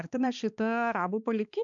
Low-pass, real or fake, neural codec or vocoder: 7.2 kHz; fake; codec, 16 kHz, 2 kbps, X-Codec, HuBERT features, trained on balanced general audio